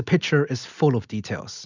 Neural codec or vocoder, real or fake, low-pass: none; real; 7.2 kHz